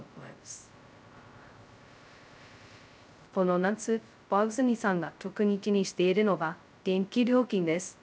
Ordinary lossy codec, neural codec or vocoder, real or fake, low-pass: none; codec, 16 kHz, 0.2 kbps, FocalCodec; fake; none